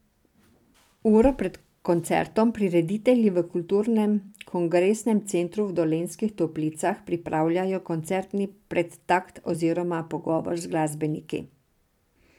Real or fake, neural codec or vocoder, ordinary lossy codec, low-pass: fake; vocoder, 44.1 kHz, 128 mel bands every 256 samples, BigVGAN v2; none; 19.8 kHz